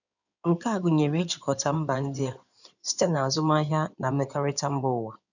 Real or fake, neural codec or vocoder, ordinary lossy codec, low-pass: fake; codec, 16 kHz in and 24 kHz out, 2.2 kbps, FireRedTTS-2 codec; none; 7.2 kHz